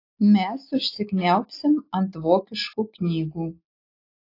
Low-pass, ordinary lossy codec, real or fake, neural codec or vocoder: 5.4 kHz; AAC, 32 kbps; real; none